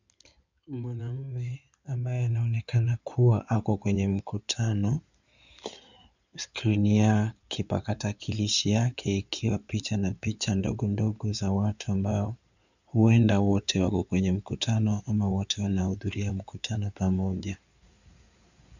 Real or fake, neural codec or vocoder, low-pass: fake; codec, 16 kHz in and 24 kHz out, 2.2 kbps, FireRedTTS-2 codec; 7.2 kHz